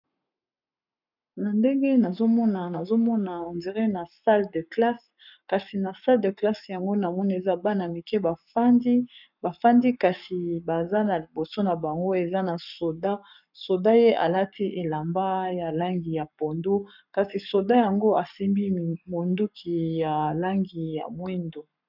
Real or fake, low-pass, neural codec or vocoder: fake; 5.4 kHz; codec, 44.1 kHz, 7.8 kbps, Pupu-Codec